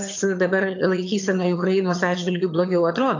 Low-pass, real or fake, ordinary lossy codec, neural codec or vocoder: 7.2 kHz; fake; AAC, 48 kbps; vocoder, 22.05 kHz, 80 mel bands, HiFi-GAN